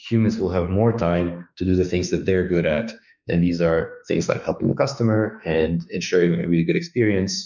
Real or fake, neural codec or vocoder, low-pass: fake; autoencoder, 48 kHz, 32 numbers a frame, DAC-VAE, trained on Japanese speech; 7.2 kHz